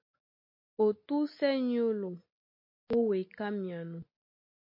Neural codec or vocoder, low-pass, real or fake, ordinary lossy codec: none; 5.4 kHz; real; MP3, 32 kbps